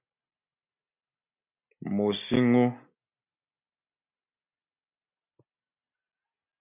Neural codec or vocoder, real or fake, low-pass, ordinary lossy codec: none; real; 3.6 kHz; MP3, 32 kbps